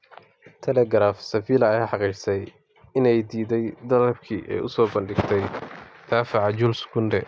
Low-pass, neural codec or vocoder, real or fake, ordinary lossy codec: none; none; real; none